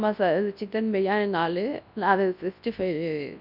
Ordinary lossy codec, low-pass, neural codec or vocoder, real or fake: none; 5.4 kHz; codec, 24 kHz, 0.9 kbps, WavTokenizer, large speech release; fake